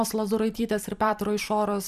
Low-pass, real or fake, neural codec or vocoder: 14.4 kHz; fake; vocoder, 44.1 kHz, 128 mel bands every 256 samples, BigVGAN v2